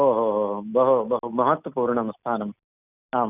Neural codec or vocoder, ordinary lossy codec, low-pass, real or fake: none; none; 3.6 kHz; real